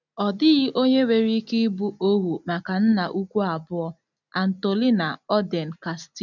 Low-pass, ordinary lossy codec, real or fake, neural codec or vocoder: 7.2 kHz; none; real; none